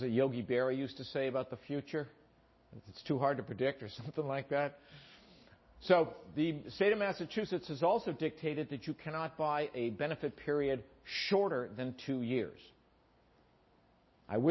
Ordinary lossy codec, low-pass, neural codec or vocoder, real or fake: MP3, 24 kbps; 7.2 kHz; none; real